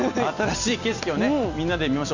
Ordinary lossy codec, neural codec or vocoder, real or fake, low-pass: none; none; real; 7.2 kHz